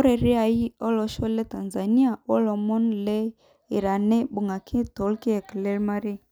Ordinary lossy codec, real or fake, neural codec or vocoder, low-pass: none; real; none; none